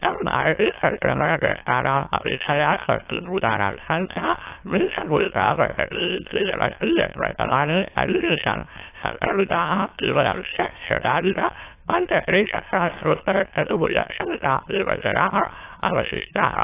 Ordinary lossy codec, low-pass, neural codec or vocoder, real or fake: AAC, 24 kbps; 3.6 kHz; autoencoder, 22.05 kHz, a latent of 192 numbers a frame, VITS, trained on many speakers; fake